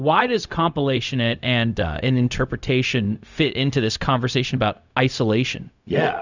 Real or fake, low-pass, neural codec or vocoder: fake; 7.2 kHz; codec, 16 kHz, 0.4 kbps, LongCat-Audio-Codec